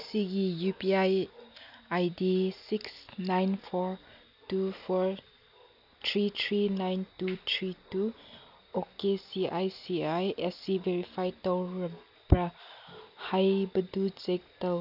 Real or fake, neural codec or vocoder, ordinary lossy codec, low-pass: real; none; none; 5.4 kHz